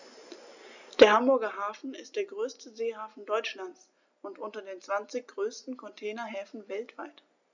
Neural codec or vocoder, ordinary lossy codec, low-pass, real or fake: none; none; 7.2 kHz; real